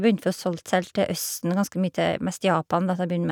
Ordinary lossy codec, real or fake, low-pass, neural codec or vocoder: none; real; none; none